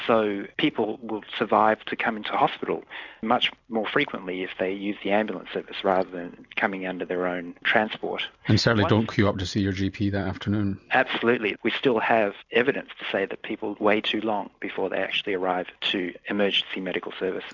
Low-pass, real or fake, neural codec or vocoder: 7.2 kHz; real; none